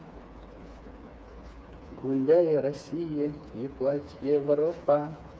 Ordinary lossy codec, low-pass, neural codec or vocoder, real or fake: none; none; codec, 16 kHz, 4 kbps, FreqCodec, smaller model; fake